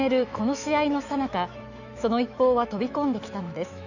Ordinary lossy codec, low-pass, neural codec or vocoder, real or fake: none; 7.2 kHz; autoencoder, 48 kHz, 128 numbers a frame, DAC-VAE, trained on Japanese speech; fake